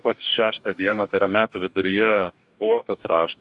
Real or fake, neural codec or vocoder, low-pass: fake; codec, 44.1 kHz, 2.6 kbps, DAC; 10.8 kHz